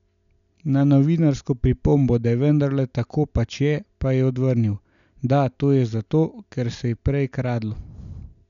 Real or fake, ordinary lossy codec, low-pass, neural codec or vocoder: real; none; 7.2 kHz; none